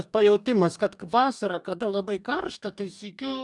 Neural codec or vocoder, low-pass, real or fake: codec, 44.1 kHz, 2.6 kbps, DAC; 10.8 kHz; fake